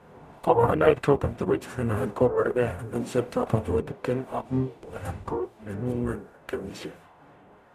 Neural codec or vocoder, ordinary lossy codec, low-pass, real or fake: codec, 44.1 kHz, 0.9 kbps, DAC; none; 14.4 kHz; fake